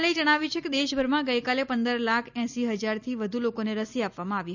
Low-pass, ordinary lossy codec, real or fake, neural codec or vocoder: 7.2 kHz; none; real; none